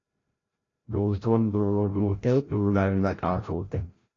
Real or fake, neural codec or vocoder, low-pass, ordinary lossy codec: fake; codec, 16 kHz, 0.5 kbps, FreqCodec, larger model; 7.2 kHz; AAC, 32 kbps